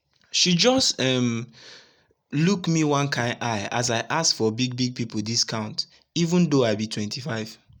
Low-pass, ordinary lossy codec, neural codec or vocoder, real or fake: 19.8 kHz; none; none; real